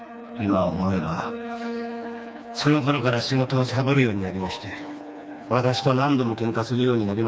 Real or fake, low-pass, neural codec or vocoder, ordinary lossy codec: fake; none; codec, 16 kHz, 2 kbps, FreqCodec, smaller model; none